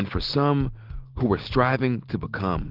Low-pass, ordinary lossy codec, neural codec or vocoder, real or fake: 5.4 kHz; Opus, 16 kbps; none; real